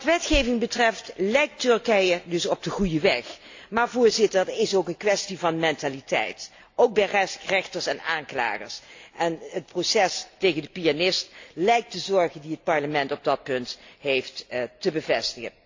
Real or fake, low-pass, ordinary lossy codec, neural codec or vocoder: real; 7.2 kHz; AAC, 48 kbps; none